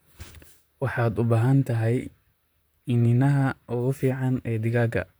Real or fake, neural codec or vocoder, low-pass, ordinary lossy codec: fake; vocoder, 44.1 kHz, 128 mel bands, Pupu-Vocoder; none; none